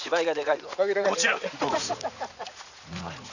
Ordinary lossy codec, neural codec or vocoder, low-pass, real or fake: none; vocoder, 44.1 kHz, 80 mel bands, Vocos; 7.2 kHz; fake